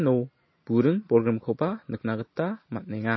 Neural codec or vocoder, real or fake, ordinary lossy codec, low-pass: none; real; MP3, 24 kbps; 7.2 kHz